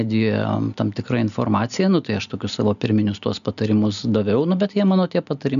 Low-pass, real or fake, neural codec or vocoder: 7.2 kHz; real; none